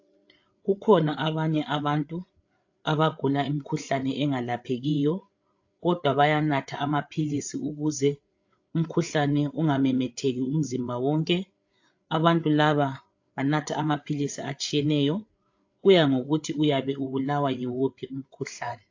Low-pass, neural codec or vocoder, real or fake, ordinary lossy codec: 7.2 kHz; codec, 16 kHz, 8 kbps, FreqCodec, larger model; fake; AAC, 48 kbps